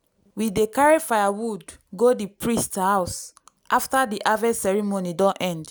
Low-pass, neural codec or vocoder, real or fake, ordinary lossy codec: none; none; real; none